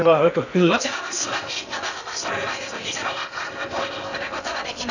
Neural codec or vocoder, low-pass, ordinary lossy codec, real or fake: codec, 16 kHz in and 24 kHz out, 0.6 kbps, FocalCodec, streaming, 2048 codes; 7.2 kHz; none; fake